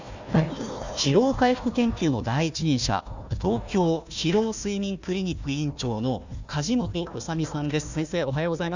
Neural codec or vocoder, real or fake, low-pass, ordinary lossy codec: codec, 16 kHz, 1 kbps, FunCodec, trained on Chinese and English, 50 frames a second; fake; 7.2 kHz; none